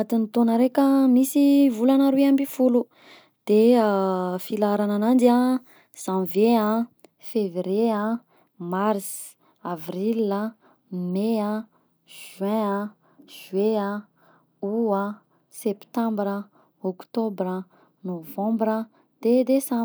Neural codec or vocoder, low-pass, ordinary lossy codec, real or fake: none; none; none; real